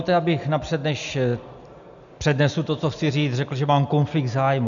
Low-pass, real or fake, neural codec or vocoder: 7.2 kHz; real; none